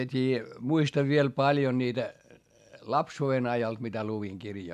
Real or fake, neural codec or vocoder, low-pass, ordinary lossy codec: real; none; 14.4 kHz; AAC, 96 kbps